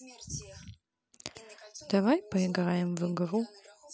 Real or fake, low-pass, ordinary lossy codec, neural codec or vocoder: real; none; none; none